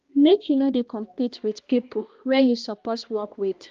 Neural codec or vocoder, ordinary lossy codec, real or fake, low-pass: codec, 16 kHz, 1 kbps, X-Codec, HuBERT features, trained on balanced general audio; Opus, 32 kbps; fake; 7.2 kHz